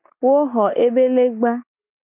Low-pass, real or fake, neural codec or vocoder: 3.6 kHz; real; none